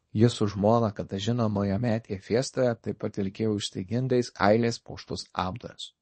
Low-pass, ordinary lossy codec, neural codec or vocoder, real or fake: 10.8 kHz; MP3, 32 kbps; codec, 24 kHz, 0.9 kbps, WavTokenizer, small release; fake